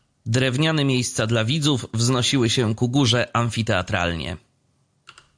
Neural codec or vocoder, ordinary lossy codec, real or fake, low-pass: none; AAC, 64 kbps; real; 9.9 kHz